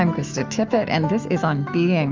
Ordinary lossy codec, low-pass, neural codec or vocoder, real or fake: Opus, 32 kbps; 7.2 kHz; autoencoder, 48 kHz, 128 numbers a frame, DAC-VAE, trained on Japanese speech; fake